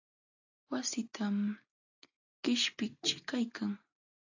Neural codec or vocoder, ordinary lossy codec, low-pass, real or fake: none; AAC, 48 kbps; 7.2 kHz; real